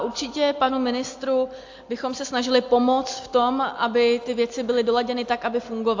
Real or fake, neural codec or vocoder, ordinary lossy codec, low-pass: real; none; AAC, 48 kbps; 7.2 kHz